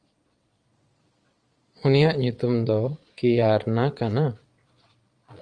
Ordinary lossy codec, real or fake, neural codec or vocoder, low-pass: Opus, 32 kbps; fake; vocoder, 22.05 kHz, 80 mel bands, Vocos; 9.9 kHz